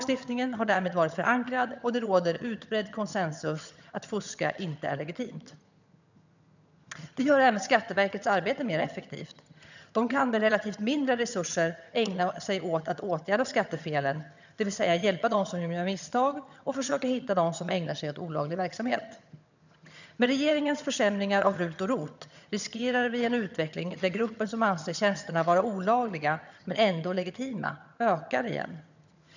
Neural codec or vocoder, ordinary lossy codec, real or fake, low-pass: vocoder, 22.05 kHz, 80 mel bands, HiFi-GAN; none; fake; 7.2 kHz